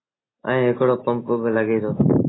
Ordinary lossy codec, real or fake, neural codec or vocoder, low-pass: AAC, 16 kbps; real; none; 7.2 kHz